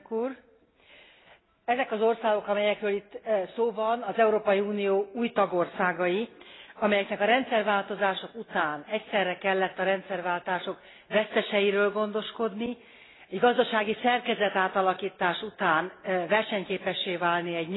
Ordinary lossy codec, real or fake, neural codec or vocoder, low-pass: AAC, 16 kbps; real; none; 7.2 kHz